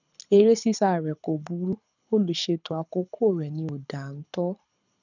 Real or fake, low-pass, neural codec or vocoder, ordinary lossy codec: fake; 7.2 kHz; codec, 24 kHz, 6 kbps, HILCodec; none